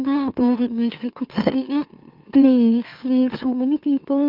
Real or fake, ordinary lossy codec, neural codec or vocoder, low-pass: fake; Opus, 24 kbps; autoencoder, 44.1 kHz, a latent of 192 numbers a frame, MeloTTS; 5.4 kHz